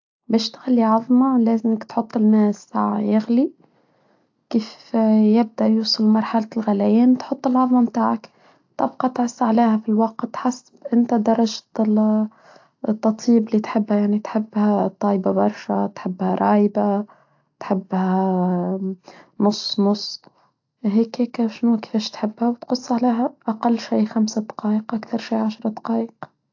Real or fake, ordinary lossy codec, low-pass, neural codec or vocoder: real; AAC, 48 kbps; 7.2 kHz; none